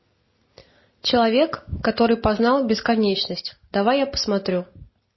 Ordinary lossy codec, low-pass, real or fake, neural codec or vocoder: MP3, 24 kbps; 7.2 kHz; real; none